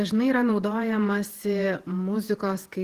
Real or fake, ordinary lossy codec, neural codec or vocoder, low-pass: fake; Opus, 16 kbps; vocoder, 48 kHz, 128 mel bands, Vocos; 14.4 kHz